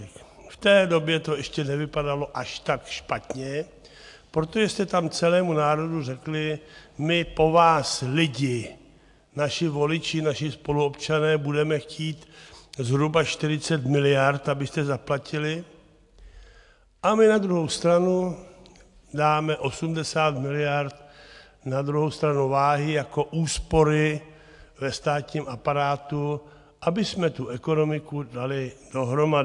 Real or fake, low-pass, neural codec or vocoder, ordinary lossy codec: real; 10.8 kHz; none; AAC, 64 kbps